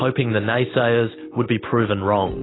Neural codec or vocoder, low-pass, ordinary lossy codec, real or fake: none; 7.2 kHz; AAC, 16 kbps; real